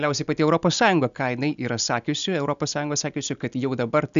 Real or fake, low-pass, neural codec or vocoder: real; 7.2 kHz; none